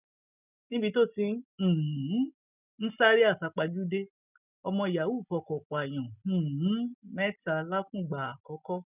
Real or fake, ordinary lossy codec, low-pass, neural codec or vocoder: real; none; 3.6 kHz; none